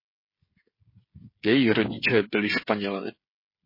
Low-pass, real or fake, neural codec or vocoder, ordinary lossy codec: 5.4 kHz; fake; codec, 16 kHz, 16 kbps, FreqCodec, smaller model; MP3, 24 kbps